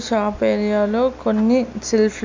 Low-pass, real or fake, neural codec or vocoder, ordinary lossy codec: 7.2 kHz; real; none; MP3, 64 kbps